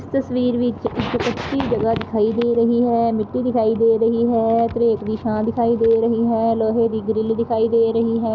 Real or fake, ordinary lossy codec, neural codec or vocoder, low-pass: real; none; none; none